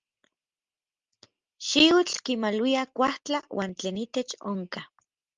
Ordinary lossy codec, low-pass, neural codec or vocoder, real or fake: Opus, 32 kbps; 7.2 kHz; none; real